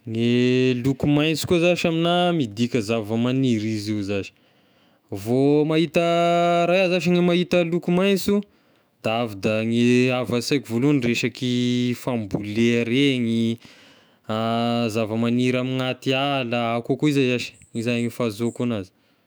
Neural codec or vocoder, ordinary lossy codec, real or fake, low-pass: autoencoder, 48 kHz, 128 numbers a frame, DAC-VAE, trained on Japanese speech; none; fake; none